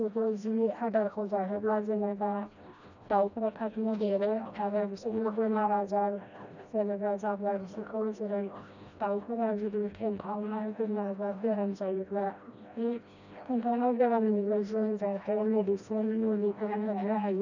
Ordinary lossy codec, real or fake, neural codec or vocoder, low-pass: none; fake; codec, 16 kHz, 1 kbps, FreqCodec, smaller model; 7.2 kHz